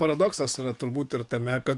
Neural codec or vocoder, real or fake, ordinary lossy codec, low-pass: codec, 44.1 kHz, 7.8 kbps, DAC; fake; MP3, 96 kbps; 10.8 kHz